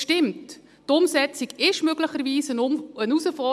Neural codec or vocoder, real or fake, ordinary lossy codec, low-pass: none; real; none; none